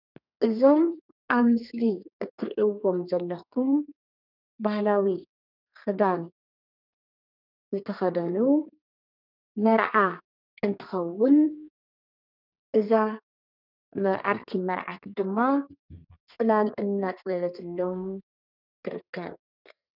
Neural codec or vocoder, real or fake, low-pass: codec, 32 kHz, 1.9 kbps, SNAC; fake; 5.4 kHz